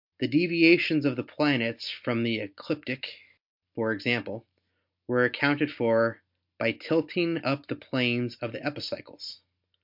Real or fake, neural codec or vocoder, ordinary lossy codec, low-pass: real; none; MP3, 48 kbps; 5.4 kHz